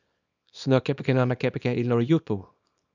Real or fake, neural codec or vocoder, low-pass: fake; codec, 24 kHz, 0.9 kbps, WavTokenizer, small release; 7.2 kHz